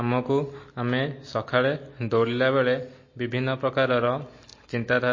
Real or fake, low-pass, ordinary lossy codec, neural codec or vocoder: real; 7.2 kHz; MP3, 32 kbps; none